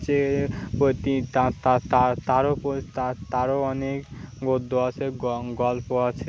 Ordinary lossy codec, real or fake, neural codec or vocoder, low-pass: none; real; none; none